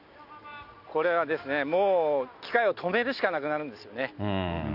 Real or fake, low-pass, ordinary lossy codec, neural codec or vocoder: real; 5.4 kHz; none; none